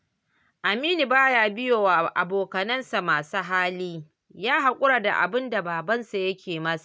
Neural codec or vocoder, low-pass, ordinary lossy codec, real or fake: none; none; none; real